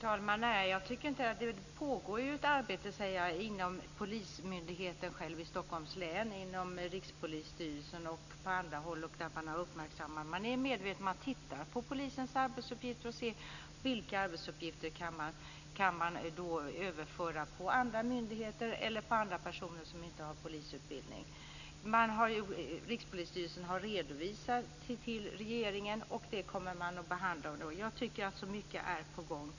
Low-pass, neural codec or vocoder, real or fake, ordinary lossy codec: 7.2 kHz; none; real; none